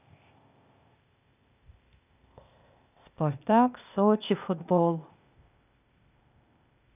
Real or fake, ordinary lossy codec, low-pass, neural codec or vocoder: fake; none; 3.6 kHz; codec, 16 kHz, 0.8 kbps, ZipCodec